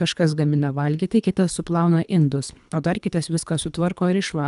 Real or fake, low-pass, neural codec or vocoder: fake; 10.8 kHz; codec, 24 kHz, 3 kbps, HILCodec